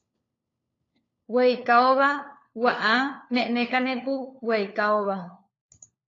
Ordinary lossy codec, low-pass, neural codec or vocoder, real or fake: AAC, 32 kbps; 7.2 kHz; codec, 16 kHz, 4 kbps, FunCodec, trained on LibriTTS, 50 frames a second; fake